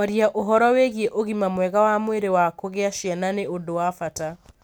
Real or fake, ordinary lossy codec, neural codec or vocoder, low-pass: real; none; none; none